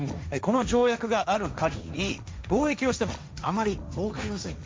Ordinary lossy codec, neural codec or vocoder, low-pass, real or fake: none; codec, 16 kHz, 1.1 kbps, Voila-Tokenizer; none; fake